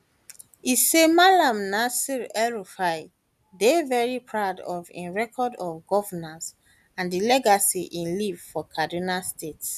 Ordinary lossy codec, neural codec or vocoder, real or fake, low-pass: none; none; real; 14.4 kHz